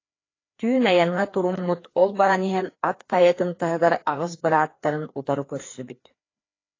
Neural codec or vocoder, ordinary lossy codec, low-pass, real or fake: codec, 16 kHz, 2 kbps, FreqCodec, larger model; AAC, 32 kbps; 7.2 kHz; fake